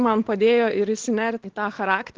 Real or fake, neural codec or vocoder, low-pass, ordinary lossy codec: real; none; 7.2 kHz; Opus, 16 kbps